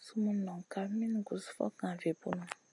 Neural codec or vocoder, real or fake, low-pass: none; real; 10.8 kHz